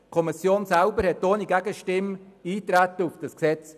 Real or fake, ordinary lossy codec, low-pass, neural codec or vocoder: real; none; 14.4 kHz; none